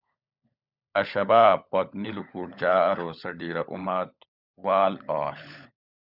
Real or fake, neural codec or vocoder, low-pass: fake; codec, 16 kHz, 16 kbps, FunCodec, trained on LibriTTS, 50 frames a second; 5.4 kHz